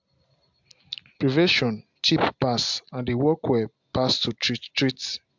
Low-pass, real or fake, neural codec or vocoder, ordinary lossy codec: 7.2 kHz; real; none; MP3, 64 kbps